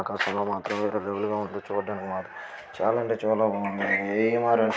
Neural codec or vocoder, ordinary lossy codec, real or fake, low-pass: none; none; real; none